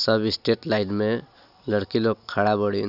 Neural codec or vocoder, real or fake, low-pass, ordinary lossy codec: none; real; 5.4 kHz; Opus, 64 kbps